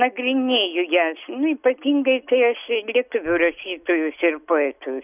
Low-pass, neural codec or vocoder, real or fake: 3.6 kHz; none; real